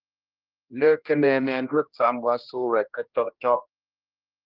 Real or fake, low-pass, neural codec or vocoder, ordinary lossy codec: fake; 5.4 kHz; codec, 16 kHz, 1 kbps, X-Codec, HuBERT features, trained on general audio; Opus, 32 kbps